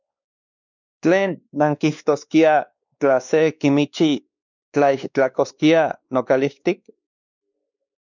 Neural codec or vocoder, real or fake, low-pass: codec, 16 kHz, 2 kbps, X-Codec, WavLM features, trained on Multilingual LibriSpeech; fake; 7.2 kHz